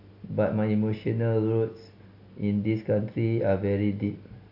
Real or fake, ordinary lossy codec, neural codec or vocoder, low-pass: real; AAC, 32 kbps; none; 5.4 kHz